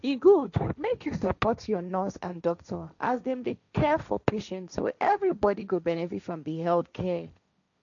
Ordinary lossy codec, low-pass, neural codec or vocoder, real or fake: none; 7.2 kHz; codec, 16 kHz, 1.1 kbps, Voila-Tokenizer; fake